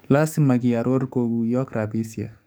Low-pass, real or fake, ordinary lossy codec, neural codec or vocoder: none; fake; none; codec, 44.1 kHz, 7.8 kbps, DAC